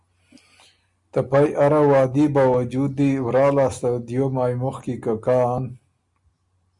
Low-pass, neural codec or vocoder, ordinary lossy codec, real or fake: 10.8 kHz; none; Opus, 64 kbps; real